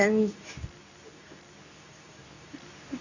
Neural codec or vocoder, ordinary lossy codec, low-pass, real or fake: codec, 24 kHz, 0.9 kbps, WavTokenizer, medium speech release version 2; none; 7.2 kHz; fake